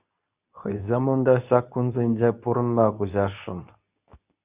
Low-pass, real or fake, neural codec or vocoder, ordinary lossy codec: 3.6 kHz; fake; codec, 24 kHz, 0.9 kbps, WavTokenizer, medium speech release version 2; Opus, 64 kbps